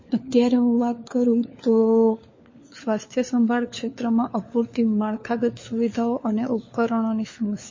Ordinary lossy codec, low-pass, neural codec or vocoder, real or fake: MP3, 32 kbps; 7.2 kHz; codec, 16 kHz, 4 kbps, FunCodec, trained on LibriTTS, 50 frames a second; fake